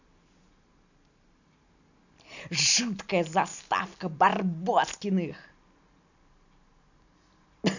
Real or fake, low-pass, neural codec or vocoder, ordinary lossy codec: real; 7.2 kHz; none; none